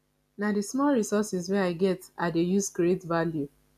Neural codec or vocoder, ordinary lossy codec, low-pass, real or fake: none; none; 14.4 kHz; real